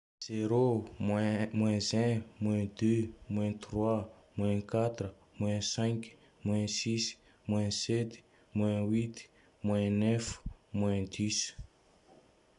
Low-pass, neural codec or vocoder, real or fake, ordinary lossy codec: 10.8 kHz; none; real; none